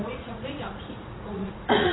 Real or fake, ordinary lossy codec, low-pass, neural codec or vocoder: fake; AAC, 16 kbps; 7.2 kHz; vocoder, 44.1 kHz, 80 mel bands, Vocos